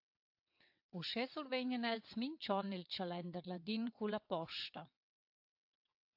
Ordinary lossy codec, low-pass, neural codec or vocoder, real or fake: AAC, 48 kbps; 5.4 kHz; vocoder, 22.05 kHz, 80 mel bands, WaveNeXt; fake